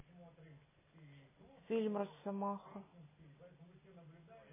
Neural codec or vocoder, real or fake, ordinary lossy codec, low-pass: none; real; MP3, 16 kbps; 3.6 kHz